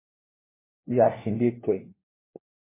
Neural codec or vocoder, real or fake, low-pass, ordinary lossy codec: codec, 16 kHz, 1 kbps, FunCodec, trained on LibriTTS, 50 frames a second; fake; 3.6 kHz; MP3, 16 kbps